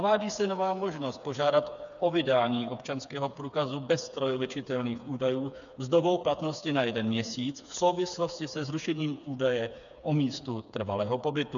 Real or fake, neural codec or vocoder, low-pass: fake; codec, 16 kHz, 4 kbps, FreqCodec, smaller model; 7.2 kHz